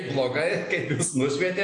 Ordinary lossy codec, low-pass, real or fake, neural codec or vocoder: AAC, 48 kbps; 9.9 kHz; real; none